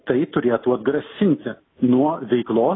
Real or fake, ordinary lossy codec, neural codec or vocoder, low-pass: real; AAC, 16 kbps; none; 7.2 kHz